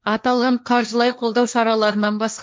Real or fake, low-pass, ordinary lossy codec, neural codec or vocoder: fake; none; none; codec, 16 kHz, 1.1 kbps, Voila-Tokenizer